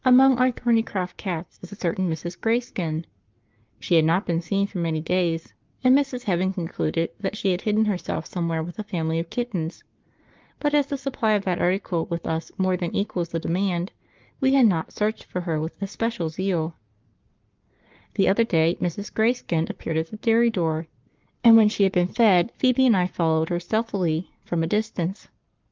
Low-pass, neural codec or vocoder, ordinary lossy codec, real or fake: 7.2 kHz; codec, 44.1 kHz, 7.8 kbps, Pupu-Codec; Opus, 24 kbps; fake